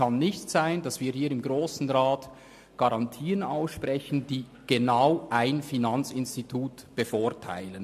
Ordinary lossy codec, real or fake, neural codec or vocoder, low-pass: MP3, 64 kbps; real; none; 14.4 kHz